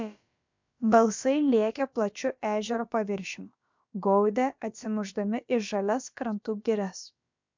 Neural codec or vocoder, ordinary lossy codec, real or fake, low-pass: codec, 16 kHz, about 1 kbps, DyCAST, with the encoder's durations; MP3, 64 kbps; fake; 7.2 kHz